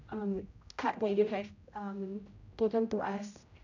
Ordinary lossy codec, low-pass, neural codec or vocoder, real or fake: none; 7.2 kHz; codec, 16 kHz, 0.5 kbps, X-Codec, HuBERT features, trained on general audio; fake